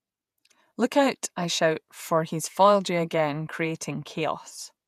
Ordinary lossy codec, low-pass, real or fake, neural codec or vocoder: none; 14.4 kHz; fake; vocoder, 48 kHz, 128 mel bands, Vocos